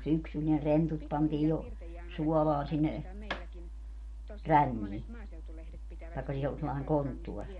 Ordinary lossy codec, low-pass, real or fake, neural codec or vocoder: MP3, 48 kbps; 19.8 kHz; real; none